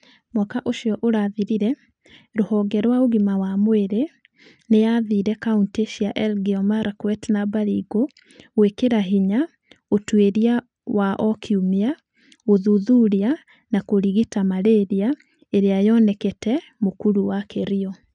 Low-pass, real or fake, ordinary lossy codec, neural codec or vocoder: 9.9 kHz; real; none; none